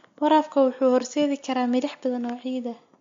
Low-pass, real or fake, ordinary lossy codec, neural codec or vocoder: 7.2 kHz; real; MP3, 48 kbps; none